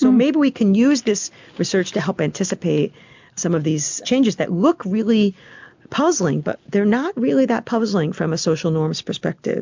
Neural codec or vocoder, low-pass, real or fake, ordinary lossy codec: none; 7.2 kHz; real; MP3, 64 kbps